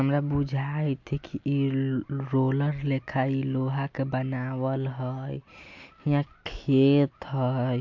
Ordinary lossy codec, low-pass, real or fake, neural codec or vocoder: MP3, 48 kbps; 7.2 kHz; real; none